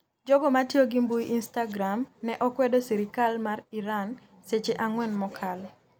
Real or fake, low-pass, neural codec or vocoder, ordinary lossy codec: real; none; none; none